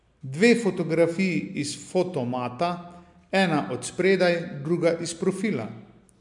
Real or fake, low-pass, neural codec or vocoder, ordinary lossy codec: real; 10.8 kHz; none; MP3, 64 kbps